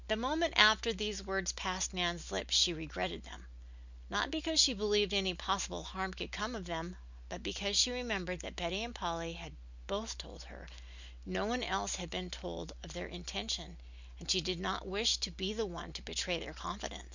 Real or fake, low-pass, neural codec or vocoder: real; 7.2 kHz; none